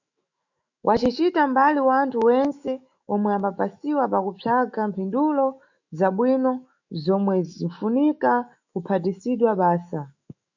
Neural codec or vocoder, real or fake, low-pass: autoencoder, 48 kHz, 128 numbers a frame, DAC-VAE, trained on Japanese speech; fake; 7.2 kHz